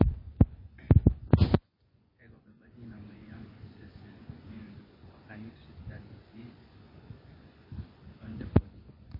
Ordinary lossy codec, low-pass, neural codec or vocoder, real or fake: MP3, 24 kbps; 5.4 kHz; vocoder, 24 kHz, 100 mel bands, Vocos; fake